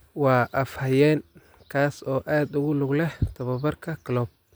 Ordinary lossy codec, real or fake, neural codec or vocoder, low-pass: none; real; none; none